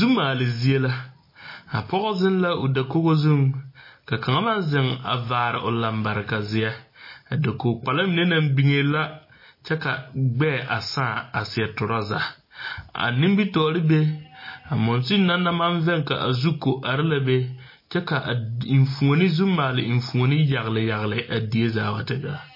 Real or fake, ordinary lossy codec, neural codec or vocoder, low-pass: real; MP3, 24 kbps; none; 5.4 kHz